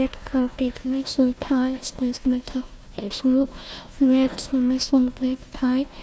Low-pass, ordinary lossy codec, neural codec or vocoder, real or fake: none; none; codec, 16 kHz, 1 kbps, FunCodec, trained on Chinese and English, 50 frames a second; fake